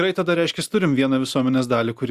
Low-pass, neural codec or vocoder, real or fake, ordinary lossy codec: 14.4 kHz; none; real; Opus, 64 kbps